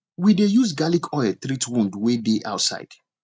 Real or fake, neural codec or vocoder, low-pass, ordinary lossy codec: real; none; none; none